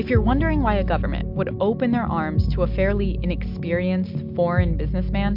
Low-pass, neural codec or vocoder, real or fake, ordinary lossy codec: 5.4 kHz; none; real; MP3, 48 kbps